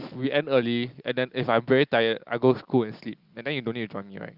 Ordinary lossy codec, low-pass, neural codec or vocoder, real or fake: Opus, 24 kbps; 5.4 kHz; none; real